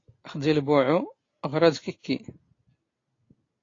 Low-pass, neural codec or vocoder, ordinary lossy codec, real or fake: 7.2 kHz; none; AAC, 32 kbps; real